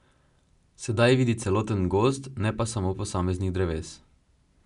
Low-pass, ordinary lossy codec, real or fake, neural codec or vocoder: 10.8 kHz; none; real; none